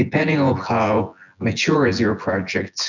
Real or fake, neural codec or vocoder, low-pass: fake; vocoder, 24 kHz, 100 mel bands, Vocos; 7.2 kHz